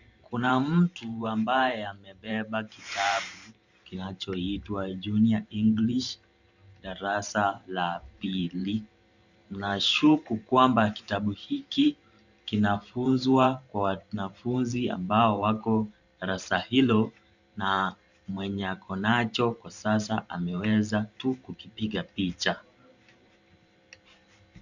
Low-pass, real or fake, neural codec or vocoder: 7.2 kHz; fake; vocoder, 44.1 kHz, 128 mel bands every 512 samples, BigVGAN v2